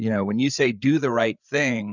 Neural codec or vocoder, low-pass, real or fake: codec, 16 kHz, 8 kbps, FreqCodec, larger model; 7.2 kHz; fake